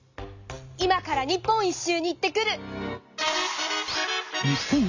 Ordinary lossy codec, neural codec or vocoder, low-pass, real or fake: none; none; 7.2 kHz; real